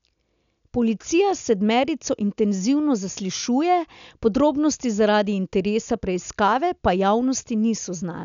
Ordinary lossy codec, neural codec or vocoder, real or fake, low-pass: none; none; real; 7.2 kHz